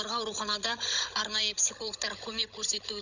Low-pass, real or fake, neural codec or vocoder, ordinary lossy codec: 7.2 kHz; fake; codec, 16 kHz, 16 kbps, FunCodec, trained on Chinese and English, 50 frames a second; none